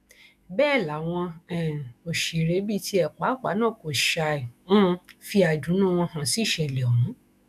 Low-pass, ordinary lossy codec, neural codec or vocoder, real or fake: 14.4 kHz; none; autoencoder, 48 kHz, 128 numbers a frame, DAC-VAE, trained on Japanese speech; fake